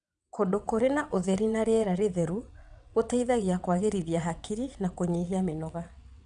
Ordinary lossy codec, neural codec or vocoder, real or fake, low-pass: none; vocoder, 22.05 kHz, 80 mel bands, WaveNeXt; fake; 9.9 kHz